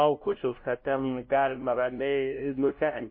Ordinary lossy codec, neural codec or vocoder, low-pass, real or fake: MP3, 24 kbps; codec, 16 kHz, 0.5 kbps, FunCodec, trained on LibriTTS, 25 frames a second; 5.4 kHz; fake